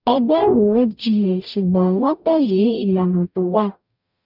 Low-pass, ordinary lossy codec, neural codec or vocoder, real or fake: 5.4 kHz; none; codec, 44.1 kHz, 0.9 kbps, DAC; fake